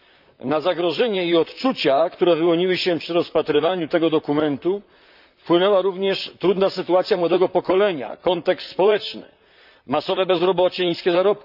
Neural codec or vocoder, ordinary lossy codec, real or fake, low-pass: vocoder, 44.1 kHz, 128 mel bands, Pupu-Vocoder; none; fake; 5.4 kHz